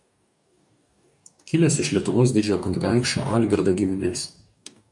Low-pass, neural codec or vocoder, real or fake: 10.8 kHz; codec, 44.1 kHz, 2.6 kbps, DAC; fake